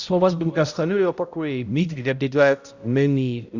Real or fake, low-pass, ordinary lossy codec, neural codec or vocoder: fake; 7.2 kHz; Opus, 64 kbps; codec, 16 kHz, 0.5 kbps, X-Codec, HuBERT features, trained on balanced general audio